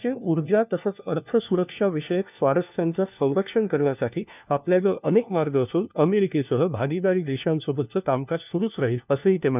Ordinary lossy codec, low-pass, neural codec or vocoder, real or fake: none; 3.6 kHz; codec, 16 kHz, 1 kbps, FunCodec, trained on LibriTTS, 50 frames a second; fake